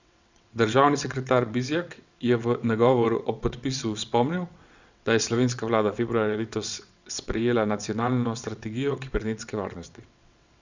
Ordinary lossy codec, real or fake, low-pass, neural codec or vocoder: Opus, 64 kbps; fake; 7.2 kHz; vocoder, 22.05 kHz, 80 mel bands, WaveNeXt